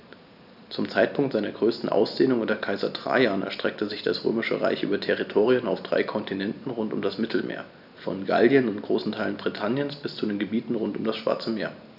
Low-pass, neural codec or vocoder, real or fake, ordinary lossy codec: 5.4 kHz; none; real; none